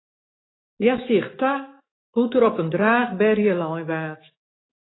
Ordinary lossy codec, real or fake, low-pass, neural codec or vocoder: AAC, 16 kbps; fake; 7.2 kHz; autoencoder, 48 kHz, 128 numbers a frame, DAC-VAE, trained on Japanese speech